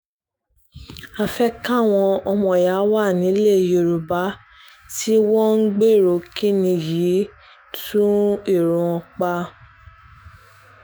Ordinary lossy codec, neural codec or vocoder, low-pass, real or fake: none; autoencoder, 48 kHz, 128 numbers a frame, DAC-VAE, trained on Japanese speech; none; fake